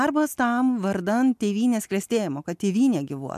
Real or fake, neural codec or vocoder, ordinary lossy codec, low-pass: real; none; MP3, 96 kbps; 14.4 kHz